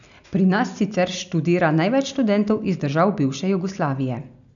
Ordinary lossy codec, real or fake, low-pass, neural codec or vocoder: none; real; 7.2 kHz; none